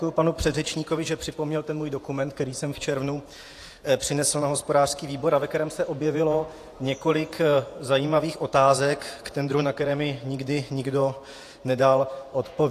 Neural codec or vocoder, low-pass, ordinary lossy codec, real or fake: vocoder, 48 kHz, 128 mel bands, Vocos; 14.4 kHz; AAC, 64 kbps; fake